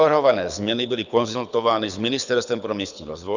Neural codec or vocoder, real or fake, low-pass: codec, 24 kHz, 6 kbps, HILCodec; fake; 7.2 kHz